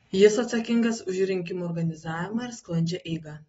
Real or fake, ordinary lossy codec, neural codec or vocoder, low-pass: real; AAC, 24 kbps; none; 19.8 kHz